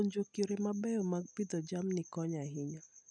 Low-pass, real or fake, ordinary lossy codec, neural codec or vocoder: 9.9 kHz; real; none; none